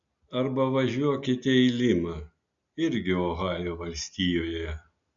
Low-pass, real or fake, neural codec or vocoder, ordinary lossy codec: 7.2 kHz; real; none; MP3, 96 kbps